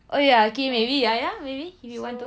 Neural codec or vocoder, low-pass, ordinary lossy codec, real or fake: none; none; none; real